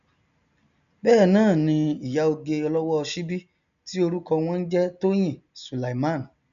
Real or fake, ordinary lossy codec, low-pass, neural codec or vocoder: real; none; 7.2 kHz; none